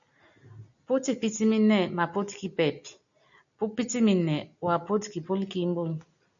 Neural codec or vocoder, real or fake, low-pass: none; real; 7.2 kHz